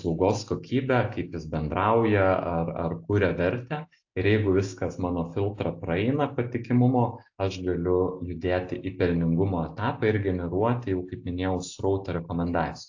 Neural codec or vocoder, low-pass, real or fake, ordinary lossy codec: none; 7.2 kHz; real; AAC, 48 kbps